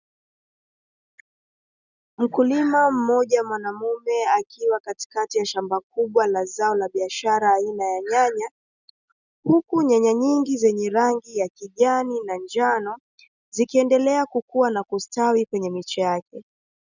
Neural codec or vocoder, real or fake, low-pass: none; real; 7.2 kHz